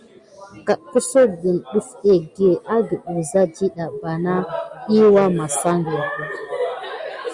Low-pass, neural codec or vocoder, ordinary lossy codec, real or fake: 10.8 kHz; none; Opus, 64 kbps; real